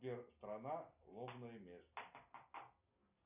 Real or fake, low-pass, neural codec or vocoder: real; 3.6 kHz; none